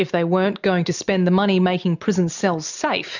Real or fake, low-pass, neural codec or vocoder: real; 7.2 kHz; none